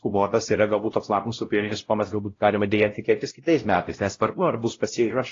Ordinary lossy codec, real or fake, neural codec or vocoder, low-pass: AAC, 32 kbps; fake; codec, 16 kHz, 0.5 kbps, X-Codec, WavLM features, trained on Multilingual LibriSpeech; 7.2 kHz